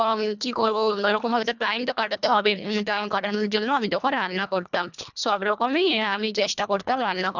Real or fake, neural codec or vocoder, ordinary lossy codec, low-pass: fake; codec, 24 kHz, 1.5 kbps, HILCodec; none; 7.2 kHz